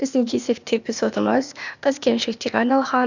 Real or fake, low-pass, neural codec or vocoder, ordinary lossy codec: fake; 7.2 kHz; codec, 16 kHz, 0.8 kbps, ZipCodec; none